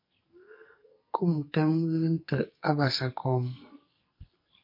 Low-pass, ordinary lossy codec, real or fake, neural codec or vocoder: 5.4 kHz; MP3, 32 kbps; fake; codec, 44.1 kHz, 2.6 kbps, SNAC